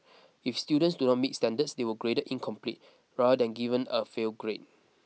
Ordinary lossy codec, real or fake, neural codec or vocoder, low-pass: none; real; none; none